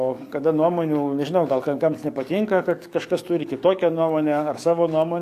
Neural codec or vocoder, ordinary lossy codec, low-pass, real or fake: codec, 44.1 kHz, 7.8 kbps, DAC; AAC, 64 kbps; 14.4 kHz; fake